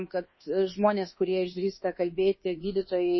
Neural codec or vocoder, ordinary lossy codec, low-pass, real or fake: codec, 24 kHz, 1.2 kbps, DualCodec; MP3, 24 kbps; 7.2 kHz; fake